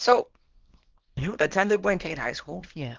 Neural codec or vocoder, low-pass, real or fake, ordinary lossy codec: codec, 24 kHz, 0.9 kbps, WavTokenizer, small release; 7.2 kHz; fake; Opus, 16 kbps